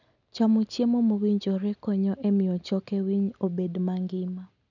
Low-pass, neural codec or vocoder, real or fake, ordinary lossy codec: 7.2 kHz; none; real; none